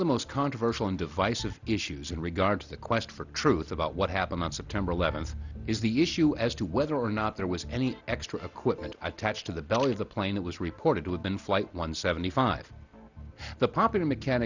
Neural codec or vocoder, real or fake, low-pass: none; real; 7.2 kHz